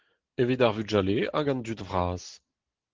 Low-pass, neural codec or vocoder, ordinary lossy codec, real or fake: 7.2 kHz; none; Opus, 16 kbps; real